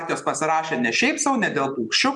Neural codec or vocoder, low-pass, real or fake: none; 10.8 kHz; real